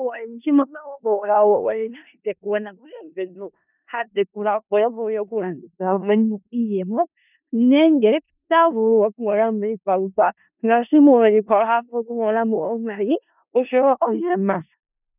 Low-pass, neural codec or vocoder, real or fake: 3.6 kHz; codec, 16 kHz in and 24 kHz out, 0.4 kbps, LongCat-Audio-Codec, four codebook decoder; fake